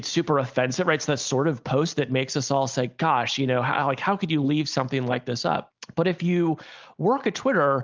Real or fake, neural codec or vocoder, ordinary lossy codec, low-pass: real; none; Opus, 32 kbps; 7.2 kHz